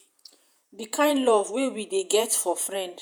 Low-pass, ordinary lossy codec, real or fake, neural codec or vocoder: none; none; fake; vocoder, 48 kHz, 128 mel bands, Vocos